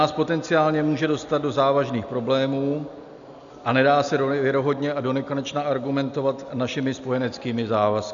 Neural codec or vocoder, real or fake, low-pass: none; real; 7.2 kHz